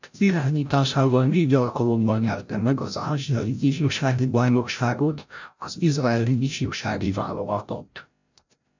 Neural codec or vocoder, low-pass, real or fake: codec, 16 kHz, 0.5 kbps, FreqCodec, larger model; 7.2 kHz; fake